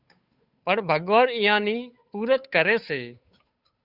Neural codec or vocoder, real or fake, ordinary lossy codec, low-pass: codec, 16 kHz, 8 kbps, FunCodec, trained on Chinese and English, 25 frames a second; fake; Opus, 64 kbps; 5.4 kHz